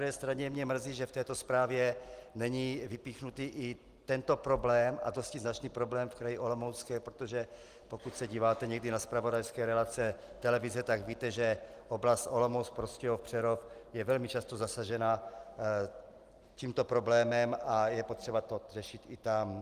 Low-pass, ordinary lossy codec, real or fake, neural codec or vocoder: 14.4 kHz; Opus, 24 kbps; real; none